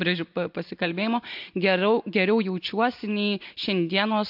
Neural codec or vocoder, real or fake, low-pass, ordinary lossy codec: none; real; 5.4 kHz; MP3, 48 kbps